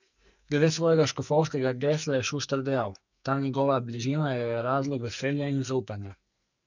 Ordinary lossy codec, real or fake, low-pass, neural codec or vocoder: none; fake; 7.2 kHz; codec, 44.1 kHz, 2.6 kbps, SNAC